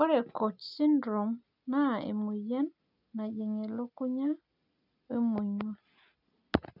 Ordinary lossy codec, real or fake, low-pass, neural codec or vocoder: none; real; 5.4 kHz; none